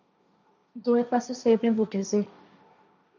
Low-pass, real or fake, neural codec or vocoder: 7.2 kHz; fake; codec, 16 kHz, 1.1 kbps, Voila-Tokenizer